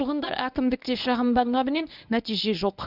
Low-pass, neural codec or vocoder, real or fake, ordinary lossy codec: 5.4 kHz; codec, 24 kHz, 0.9 kbps, WavTokenizer, medium speech release version 1; fake; none